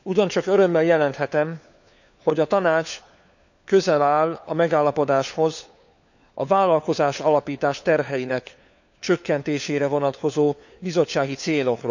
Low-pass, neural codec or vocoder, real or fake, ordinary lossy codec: 7.2 kHz; codec, 16 kHz, 4 kbps, FunCodec, trained on LibriTTS, 50 frames a second; fake; none